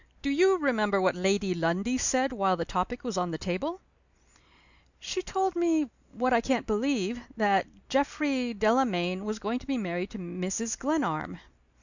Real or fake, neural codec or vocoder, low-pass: real; none; 7.2 kHz